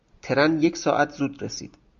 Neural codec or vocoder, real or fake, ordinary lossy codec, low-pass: none; real; MP3, 64 kbps; 7.2 kHz